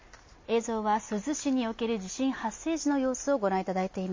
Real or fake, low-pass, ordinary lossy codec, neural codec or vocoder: real; 7.2 kHz; MP3, 32 kbps; none